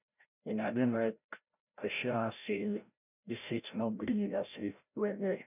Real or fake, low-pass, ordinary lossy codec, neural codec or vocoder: fake; 3.6 kHz; none; codec, 16 kHz, 0.5 kbps, FreqCodec, larger model